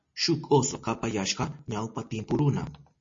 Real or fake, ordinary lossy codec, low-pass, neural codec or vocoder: real; MP3, 32 kbps; 7.2 kHz; none